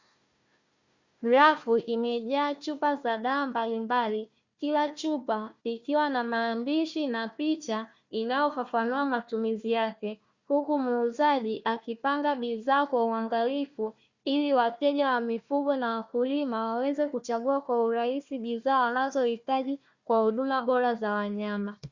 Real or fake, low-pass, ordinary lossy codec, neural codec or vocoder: fake; 7.2 kHz; Opus, 64 kbps; codec, 16 kHz, 1 kbps, FunCodec, trained on Chinese and English, 50 frames a second